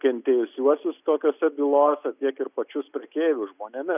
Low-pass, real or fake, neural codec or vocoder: 3.6 kHz; real; none